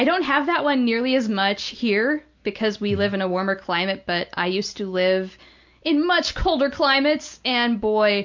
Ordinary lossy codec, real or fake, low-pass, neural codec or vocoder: MP3, 64 kbps; real; 7.2 kHz; none